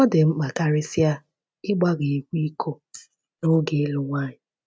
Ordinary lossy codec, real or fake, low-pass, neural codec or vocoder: none; real; none; none